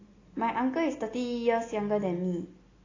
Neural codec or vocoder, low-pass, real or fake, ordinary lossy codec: none; 7.2 kHz; real; AAC, 32 kbps